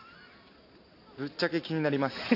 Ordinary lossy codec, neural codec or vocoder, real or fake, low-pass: AAC, 32 kbps; none; real; 5.4 kHz